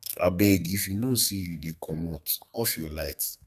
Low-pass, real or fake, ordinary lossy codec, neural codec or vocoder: 14.4 kHz; fake; none; codec, 32 kHz, 1.9 kbps, SNAC